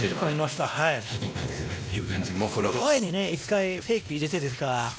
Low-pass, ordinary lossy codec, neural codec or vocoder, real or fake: none; none; codec, 16 kHz, 1 kbps, X-Codec, WavLM features, trained on Multilingual LibriSpeech; fake